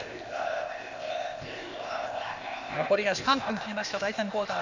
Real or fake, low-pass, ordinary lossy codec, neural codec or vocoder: fake; 7.2 kHz; Opus, 64 kbps; codec, 16 kHz, 0.8 kbps, ZipCodec